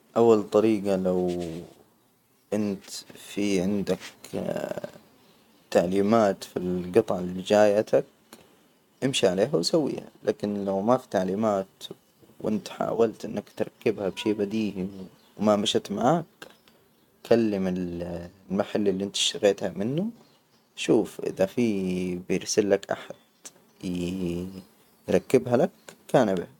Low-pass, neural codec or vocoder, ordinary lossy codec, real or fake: 19.8 kHz; none; none; real